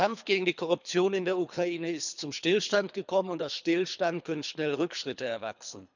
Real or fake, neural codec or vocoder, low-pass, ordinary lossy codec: fake; codec, 24 kHz, 3 kbps, HILCodec; 7.2 kHz; none